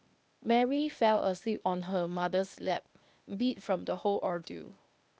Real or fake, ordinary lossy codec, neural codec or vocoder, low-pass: fake; none; codec, 16 kHz, 0.8 kbps, ZipCodec; none